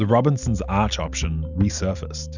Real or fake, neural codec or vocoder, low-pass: real; none; 7.2 kHz